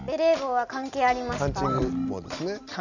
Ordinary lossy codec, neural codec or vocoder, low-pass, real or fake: none; none; 7.2 kHz; real